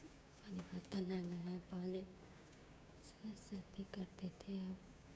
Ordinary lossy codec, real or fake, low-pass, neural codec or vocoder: none; fake; none; codec, 16 kHz, 6 kbps, DAC